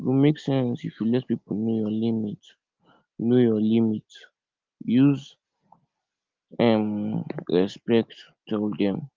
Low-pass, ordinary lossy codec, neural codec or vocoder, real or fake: 7.2 kHz; Opus, 32 kbps; none; real